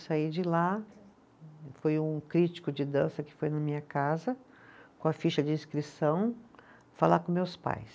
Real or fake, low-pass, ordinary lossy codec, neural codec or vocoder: real; none; none; none